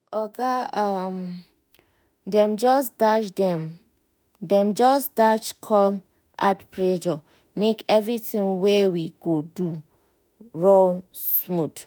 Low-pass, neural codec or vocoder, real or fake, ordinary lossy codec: none; autoencoder, 48 kHz, 32 numbers a frame, DAC-VAE, trained on Japanese speech; fake; none